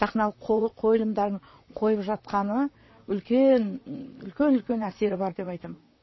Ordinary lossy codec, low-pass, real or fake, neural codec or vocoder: MP3, 24 kbps; 7.2 kHz; fake; vocoder, 44.1 kHz, 128 mel bands, Pupu-Vocoder